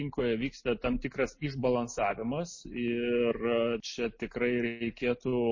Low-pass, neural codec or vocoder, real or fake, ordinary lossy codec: 7.2 kHz; none; real; MP3, 32 kbps